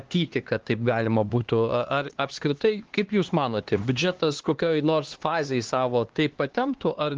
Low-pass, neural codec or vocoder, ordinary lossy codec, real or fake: 7.2 kHz; codec, 16 kHz, 2 kbps, X-Codec, HuBERT features, trained on LibriSpeech; Opus, 16 kbps; fake